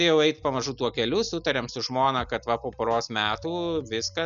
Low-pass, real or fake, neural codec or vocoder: 7.2 kHz; real; none